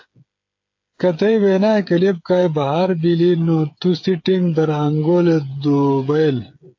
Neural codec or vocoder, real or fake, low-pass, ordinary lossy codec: codec, 16 kHz, 8 kbps, FreqCodec, smaller model; fake; 7.2 kHz; AAC, 48 kbps